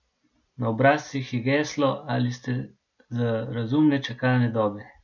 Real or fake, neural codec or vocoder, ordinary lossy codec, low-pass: real; none; none; 7.2 kHz